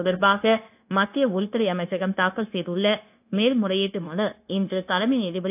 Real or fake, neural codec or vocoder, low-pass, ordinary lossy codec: fake; codec, 16 kHz, 0.9 kbps, LongCat-Audio-Codec; 3.6 kHz; AAC, 32 kbps